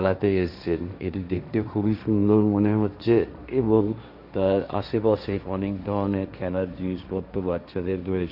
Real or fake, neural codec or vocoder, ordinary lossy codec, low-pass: fake; codec, 16 kHz, 1.1 kbps, Voila-Tokenizer; none; 5.4 kHz